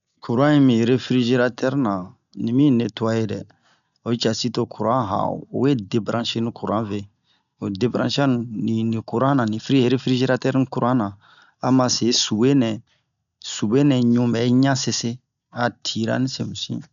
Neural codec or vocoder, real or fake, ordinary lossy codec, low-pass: none; real; none; 7.2 kHz